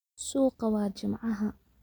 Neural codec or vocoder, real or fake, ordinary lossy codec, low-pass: none; real; none; none